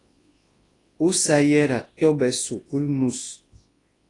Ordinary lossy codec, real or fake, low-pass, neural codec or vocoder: AAC, 32 kbps; fake; 10.8 kHz; codec, 24 kHz, 0.9 kbps, WavTokenizer, large speech release